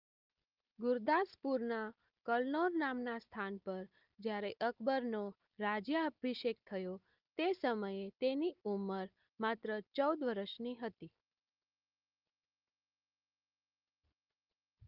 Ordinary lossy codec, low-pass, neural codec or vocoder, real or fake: Opus, 32 kbps; 5.4 kHz; none; real